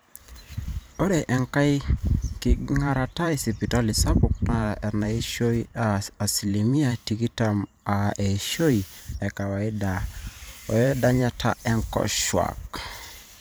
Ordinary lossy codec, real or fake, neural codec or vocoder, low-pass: none; fake; vocoder, 44.1 kHz, 128 mel bands every 512 samples, BigVGAN v2; none